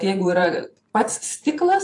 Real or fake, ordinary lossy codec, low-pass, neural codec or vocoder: fake; AAC, 64 kbps; 10.8 kHz; vocoder, 44.1 kHz, 128 mel bands every 512 samples, BigVGAN v2